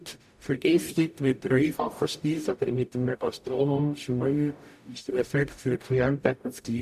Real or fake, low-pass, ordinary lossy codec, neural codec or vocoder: fake; 14.4 kHz; none; codec, 44.1 kHz, 0.9 kbps, DAC